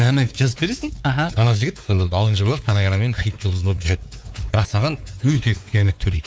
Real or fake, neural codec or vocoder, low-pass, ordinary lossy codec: fake; codec, 16 kHz, 4 kbps, X-Codec, WavLM features, trained on Multilingual LibriSpeech; none; none